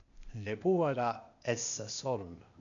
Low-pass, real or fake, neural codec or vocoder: 7.2 kHz; fake; codec, 16 kHz, 0.8 kbps, ZipCodec